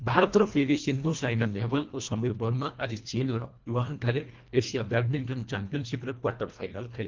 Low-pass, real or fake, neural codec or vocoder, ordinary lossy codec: 7.2 kHz; fake; codec, 24 kHz, 1.5 kbps, HILCodec; Opus, 32 kbps